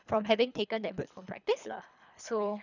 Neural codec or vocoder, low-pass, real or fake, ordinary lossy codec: codec, 24 kHz, 3 kbps, HILCodec; 7.2 kHz; fake; none